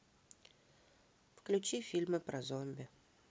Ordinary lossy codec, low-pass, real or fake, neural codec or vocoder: none; none; real; none